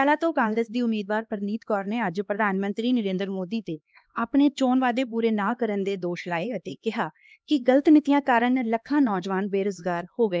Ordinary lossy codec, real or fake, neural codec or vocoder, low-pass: none; fake; codec, 16 kHz, 2 kbps, X-Codec, HuBERT features, trained on LibriSpeech; none